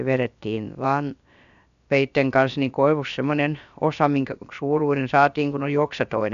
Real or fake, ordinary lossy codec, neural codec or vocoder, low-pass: fake; none; codec, 16 kHz, about 1 kbps, DyCAST, with the encoder's durations; 7.2 kHz